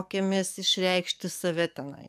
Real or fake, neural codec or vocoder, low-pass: fake; codec, 44.1 kHz, 7.8 kbps, DAC; 14.4 kHz